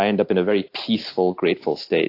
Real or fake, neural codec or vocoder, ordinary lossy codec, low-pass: real; none; AAC, 32 kbps; 5.4 kHz